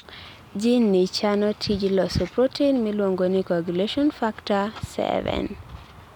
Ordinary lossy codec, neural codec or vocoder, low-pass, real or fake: none; none; 19.8 kHz; real